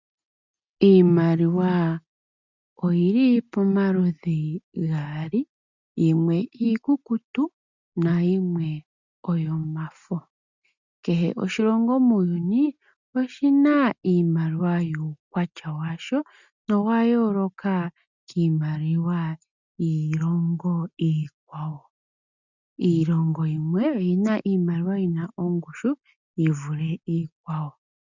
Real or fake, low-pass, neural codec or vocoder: real; 7.2 kHz; none